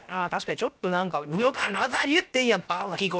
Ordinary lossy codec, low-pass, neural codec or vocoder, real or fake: none; none; codec, 16 kHz, 0.7 kbps, FocalCodec; fake